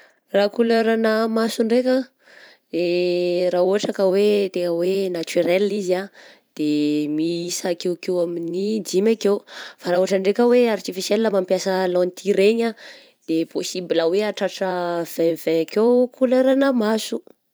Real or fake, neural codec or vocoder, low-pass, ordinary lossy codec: fake; vocoder, 44.1 kHz, 128 mel bands every 512 samples, BigVGAN v2; none; none